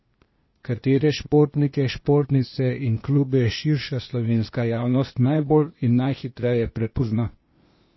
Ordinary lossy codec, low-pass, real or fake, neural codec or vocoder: MP3, 24 kbps; 7.2 kHz; fake; codec, 16 kHz, 0.8 kbps, ZipCodec